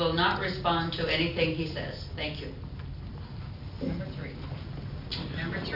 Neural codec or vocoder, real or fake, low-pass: none; real; 5.4 kHz